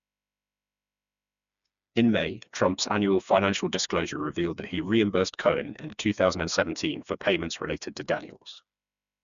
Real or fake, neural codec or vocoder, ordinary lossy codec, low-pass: fake; codec, 16 kHz, 2 kbps, FreqCodec, smaller model; none; 7.2 kHz